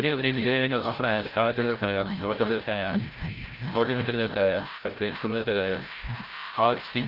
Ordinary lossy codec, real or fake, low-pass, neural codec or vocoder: Opus, 32 kbps; fake; 5.4 kHz; codec, 16 kHz, 0.5 kbps, FreqCodec, larger model